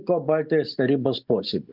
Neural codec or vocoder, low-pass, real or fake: none; 5.4 kHz; real